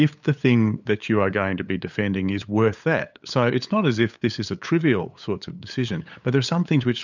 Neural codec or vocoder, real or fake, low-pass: codec, 16 kHz, 8 kbps, FunCodec, trained on LibriTTS, 25 frames a second; fake; 7.2 kHz